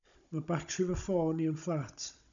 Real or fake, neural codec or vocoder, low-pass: fake; codec, 16 kHz, 16 kbps, FunCodec, trained on Chinese and English, 50 frames a second; 7.2 kHz